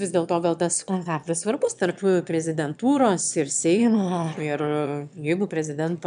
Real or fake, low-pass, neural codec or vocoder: fake; 9.9 kHz; autoencoder, 22.05 kHz, a latent of 192 numbers a frame, VITS, trained on one speaker